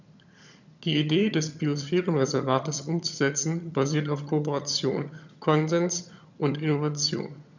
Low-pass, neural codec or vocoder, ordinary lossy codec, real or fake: 7.2 kHz; vocoder, 22.05 kHz, 80 mel bands, HiFi-GAN; none; fake